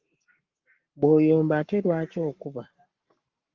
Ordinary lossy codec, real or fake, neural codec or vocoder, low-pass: Opus, 32 kbps; fake; codec, 44.1 kHz, 7.8 kbps, Pupu-Codec; 7.2 kHz